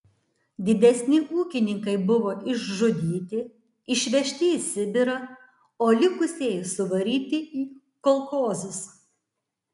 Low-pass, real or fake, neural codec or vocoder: 10.8 kHz; real; none